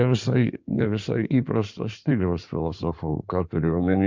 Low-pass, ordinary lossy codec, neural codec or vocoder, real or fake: 7.2 kHz; MP3, 64 kbps; codec, 16 kHz in and 24 kHz out, 2.2 kbps, FireRedTTS-2 codec; fake